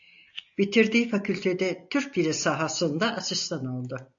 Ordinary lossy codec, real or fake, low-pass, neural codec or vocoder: AAC, 64 kbps; real; 7.2 kHz; none